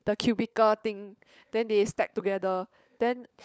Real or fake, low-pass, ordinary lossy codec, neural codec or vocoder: fake; none; none; codec, 16 kHz, 16 kbps, FunCodec, trained on LibriTTS, 50 frames a second